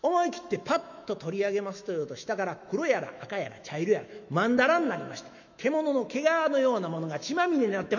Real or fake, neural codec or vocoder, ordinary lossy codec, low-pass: fake; autoencoder, 48 kHz, 128 numbers a frame, DAC-VAE, trained on Japanese speech; none; 7.2 kHz